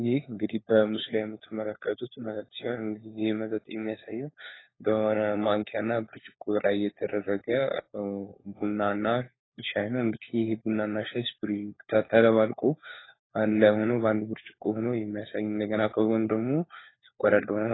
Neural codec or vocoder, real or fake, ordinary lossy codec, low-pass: codec, 16 kHz, 4 kbps, FunCodec, trained on LibriTTS, 50 frames a second; fake; AAC, 16 kbps; 7.2 kHz